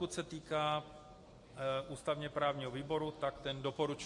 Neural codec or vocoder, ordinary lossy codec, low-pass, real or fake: none; MP3, 48 kbps; 10.8 kHz; real